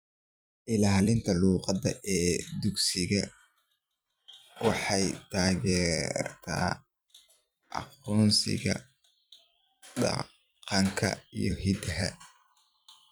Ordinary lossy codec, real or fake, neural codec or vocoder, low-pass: none; fake; vocoder, 44.1 kHz, 128 mel bands every 256 samples, BigVGAN v2; none